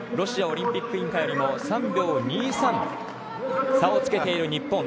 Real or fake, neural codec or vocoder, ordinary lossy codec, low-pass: real; none; none; none